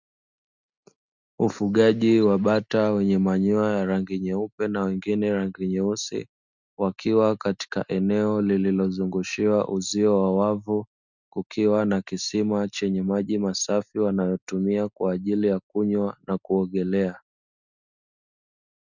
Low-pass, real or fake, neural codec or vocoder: 7.2 kHz; real; none